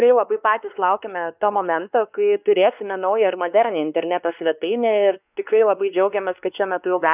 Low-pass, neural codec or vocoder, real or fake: 3.6 kHz; codec, 16 kHz, 2 kbps, X-Codec, WavLM features, trained on Multilingual LibriSpeech; fake